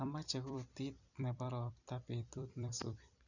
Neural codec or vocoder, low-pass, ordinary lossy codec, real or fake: vocoder, 44.1 kHz, 128 mel bands every 256 samples, BigVGAN v2; 7.2 kHz; none; fake